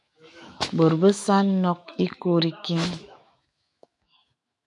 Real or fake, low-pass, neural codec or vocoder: fake; 10.8 kHz; autoencoder, 48 kHz, 128 numbers a frame, DAC-VAE, trained on Japanese speech